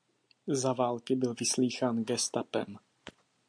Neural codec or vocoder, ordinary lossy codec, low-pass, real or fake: none; MP3, 64 kbps; 9.9 kHz; real